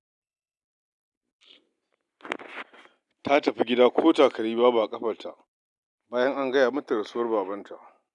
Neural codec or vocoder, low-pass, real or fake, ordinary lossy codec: none; 10.8 kHz; real; none